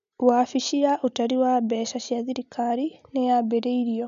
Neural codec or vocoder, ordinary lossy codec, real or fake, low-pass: none; none; real; 7.2 kHz